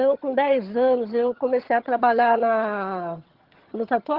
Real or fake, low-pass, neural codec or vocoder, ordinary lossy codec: fake; 5.4 kHz; vocoder, 22.05 kHz, 80 mel bands, HiFi-GAN; Opus, 16 kbps